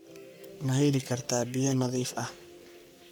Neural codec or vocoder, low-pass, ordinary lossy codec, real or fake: codec, 44.1 kHz, 3.4 kbps, Pupu-Codec; none; none; fake